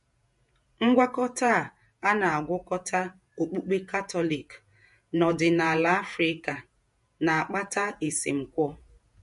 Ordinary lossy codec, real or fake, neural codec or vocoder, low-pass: MP3, 48 kbps; fake; vocoder, 48 kHz, 128 mel bands, Vocos; 14.4 kHz